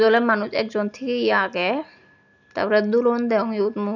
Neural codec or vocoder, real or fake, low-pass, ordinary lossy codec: none; real; 7.2 kHz; none